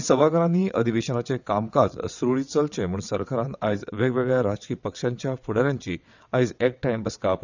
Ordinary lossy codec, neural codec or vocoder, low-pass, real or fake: none; vocoder, 22.05 kHz, 80 mel bands, WaveNeXt; 7.2 kHz; fake